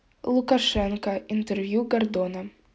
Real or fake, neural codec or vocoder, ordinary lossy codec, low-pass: real; none; none; none